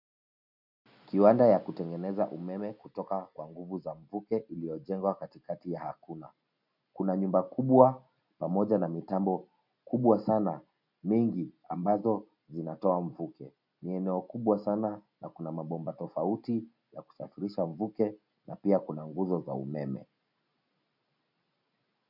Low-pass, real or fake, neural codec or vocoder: 5.4 kHz; real; none